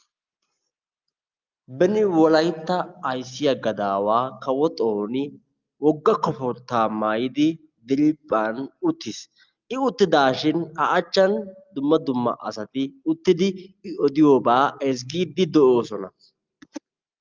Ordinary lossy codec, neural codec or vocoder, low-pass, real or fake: Opus, 24 kbps; none; 7.2 kHz; real